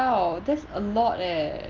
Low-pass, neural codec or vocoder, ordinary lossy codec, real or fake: 7.2 kHz; none; Opus, 32 kbps; real